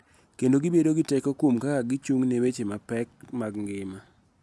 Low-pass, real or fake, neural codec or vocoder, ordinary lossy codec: none; real; none; none